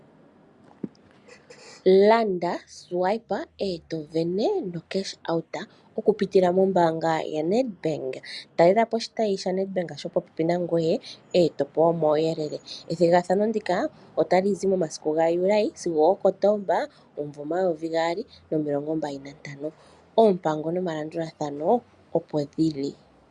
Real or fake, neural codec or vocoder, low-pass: real; none; 10.8 kHz